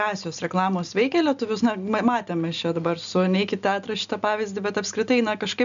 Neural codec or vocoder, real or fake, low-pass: none; real; 7.2 kHz